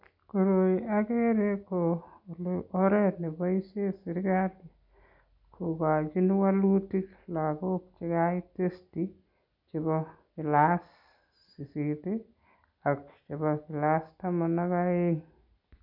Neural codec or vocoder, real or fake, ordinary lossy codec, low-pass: none; real; none; 5.4 kHz